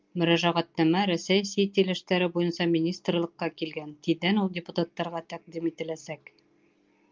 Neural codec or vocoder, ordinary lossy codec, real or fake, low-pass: none; Opus, 24 kbps; real; 7.2 kHz